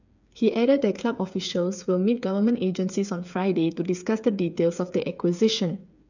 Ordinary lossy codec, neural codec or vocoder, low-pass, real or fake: none; codec, 16 kHz, 8 kbps, FreqCodec, smaller model; 7.2 kHz; fake